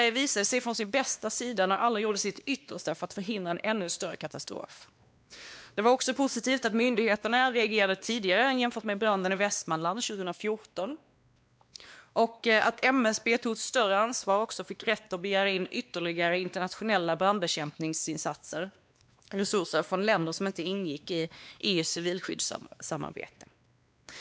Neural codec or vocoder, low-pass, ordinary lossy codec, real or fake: codec, 16 kHz, 2 kbps, X-Codec, WavLM features, trained on Multilingual LibriSpeech; none; none; fake